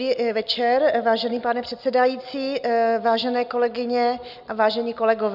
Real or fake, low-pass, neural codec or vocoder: real; 5.4 kHz; none